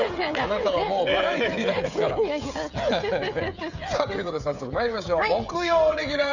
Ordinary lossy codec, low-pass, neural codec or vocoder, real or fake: none; 7.2 kHz; codec, 16 kHz, 16 kbps, FreqCodec, smaller model; fake